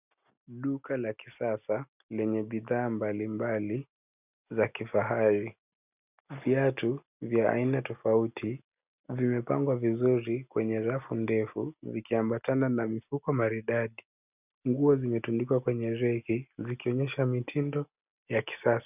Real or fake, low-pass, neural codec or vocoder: real; 3.6 kHz; none